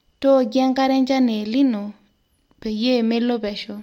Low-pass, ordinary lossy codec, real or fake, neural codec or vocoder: 19.8 kHz; MP3, 64 kbps; real; none